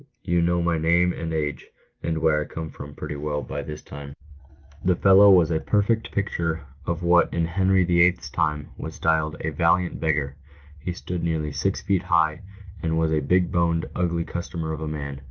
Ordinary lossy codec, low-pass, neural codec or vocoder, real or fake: Opus, 32 kbps; 7.2 kHz; none; real